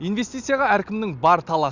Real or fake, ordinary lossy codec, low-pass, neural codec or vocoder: real; Opus, 64 kbps; 7.2 kHz; none